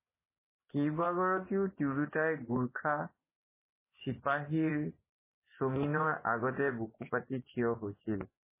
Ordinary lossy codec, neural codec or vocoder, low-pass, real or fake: MP3, 16 kbps; vocoder, 24 kHz, 100 mel bands, Vocos; 3.6 kHz; fake